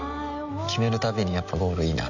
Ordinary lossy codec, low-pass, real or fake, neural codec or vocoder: none; 7.2 kHz; real; none